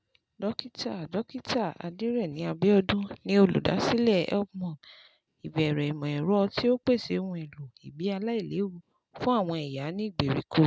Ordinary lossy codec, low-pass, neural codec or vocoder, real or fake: none; none; none; real